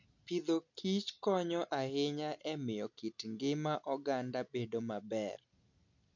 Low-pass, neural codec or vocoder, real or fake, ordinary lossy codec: 7.2 kHz; none; real; none